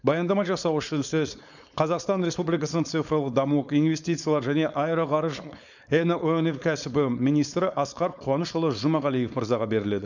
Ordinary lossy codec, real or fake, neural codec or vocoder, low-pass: none; fake; codec, 16 kHz, 4.8 kbps, FACodec; 7.2 kHz